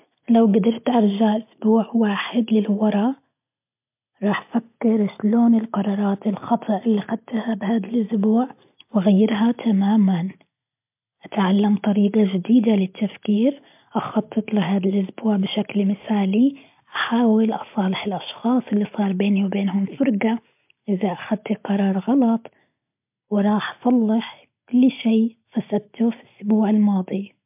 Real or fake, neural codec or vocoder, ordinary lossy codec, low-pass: real; none; MP3, 32 kbps; 3.6 kHz